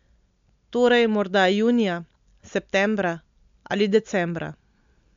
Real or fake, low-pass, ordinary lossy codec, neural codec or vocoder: real; 7.2 kHz; MP3, 64 kbps; none